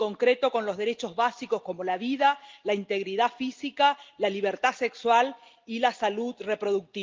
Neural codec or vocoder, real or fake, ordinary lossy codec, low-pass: none; real; Opus, 24 kbps; 7.2 kHz